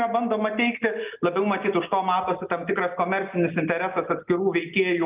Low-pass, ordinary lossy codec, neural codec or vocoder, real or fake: 3.6 kHz; Opus, 24 kbps; none; real